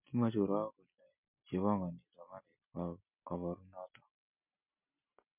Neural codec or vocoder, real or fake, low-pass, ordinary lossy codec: none; real; 3.6 kHz; MP3, 32 kbps